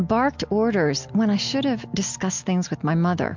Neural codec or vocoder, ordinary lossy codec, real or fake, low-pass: none; MP3, 64 kbps; real; 7.2 kHz